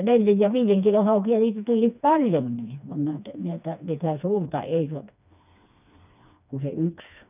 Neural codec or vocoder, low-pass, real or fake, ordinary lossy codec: codec, 16 kHz, 2 kbps, FreqCodec, smaller model; 3.6 kHz; fake; none